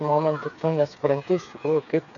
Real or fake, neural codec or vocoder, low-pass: fake; codec, 16 kHz, 4 kbps, FreqCodec, smaller model; 7.2 kHz